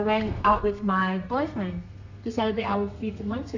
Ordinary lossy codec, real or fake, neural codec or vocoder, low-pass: none; fake; codec, 32 kHz, 1.9 kbps, SNAC; 7.2 kHz